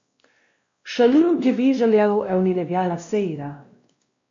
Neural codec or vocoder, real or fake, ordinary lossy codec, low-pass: codec, 16 kHz, 1 kbps, X-Codec, WavLM features, trained on Multilingual LibriSpeech; fake; MP3, 48 kbps; 7.2 kHz